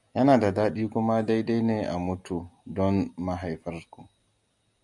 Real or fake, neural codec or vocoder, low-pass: real; none; 10.8 kHz